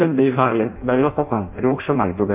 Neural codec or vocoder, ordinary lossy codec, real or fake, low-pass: codec, 16 kHz in and 24 kHz out, 0.6 kbps, FireRedTTS-2 codec; MP3, 32 kbps; fake; 3.6 kHz